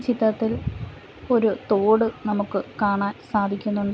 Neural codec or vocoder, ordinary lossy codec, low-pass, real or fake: none; none; none; real